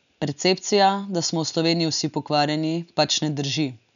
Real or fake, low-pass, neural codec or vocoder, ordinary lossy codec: real; 7.2 kHz; none; none